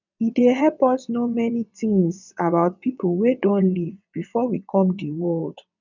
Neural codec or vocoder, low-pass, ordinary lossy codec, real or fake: vocoder, 22.05 kHz, 80 mel bands, WaveNeXt; 7.2 kHz; none; fake